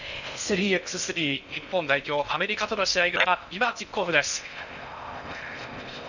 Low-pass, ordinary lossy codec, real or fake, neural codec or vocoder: 7.2 kHz; none; fake; codec, 16 kHz in and 24 kHz out, 0.6 kbps, FocalCodec, streaming, 4096 codes